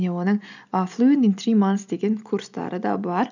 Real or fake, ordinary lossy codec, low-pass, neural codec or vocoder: real; none; 7.2 kHz; none